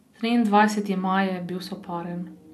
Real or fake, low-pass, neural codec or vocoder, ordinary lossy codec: real; 14.4 kHz; none; none